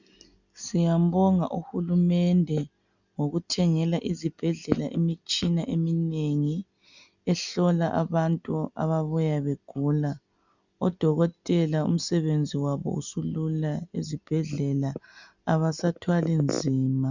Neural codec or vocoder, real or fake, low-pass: none; real; 7.2 kHz